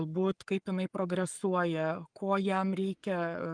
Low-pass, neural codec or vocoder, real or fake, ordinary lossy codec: 9.9 kHz; codec, 44.1 kHz, 7.8 kbps, Pupu-Codec; fake; Opus, 32 kbps